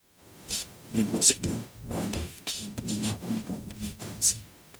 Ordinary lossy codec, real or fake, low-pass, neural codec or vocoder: none; fake; none; codec, 44.1 kHz, 0.9 kbps, DAC